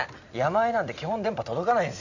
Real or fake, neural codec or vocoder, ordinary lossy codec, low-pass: real; none; none; 7.2 kHz